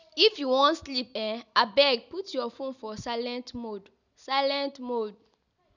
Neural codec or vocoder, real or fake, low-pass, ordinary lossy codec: none; real; 7.2 kHz; none